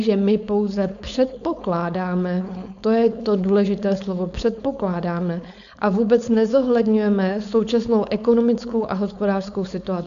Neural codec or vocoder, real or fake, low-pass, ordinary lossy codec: codec, 16 kHz, 4.8 kbps, FACodec; fake; 7.2 kHz; AAC, 96 kbps